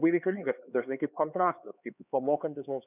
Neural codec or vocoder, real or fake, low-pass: codec, 16 kHz, 4 kbps, X-Codec, HuBERT features, trained on LibriSpeech; fake; 3.6 kHz